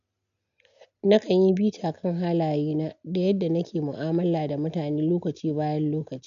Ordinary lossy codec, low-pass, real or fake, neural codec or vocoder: none; 7.2 kHz; real; none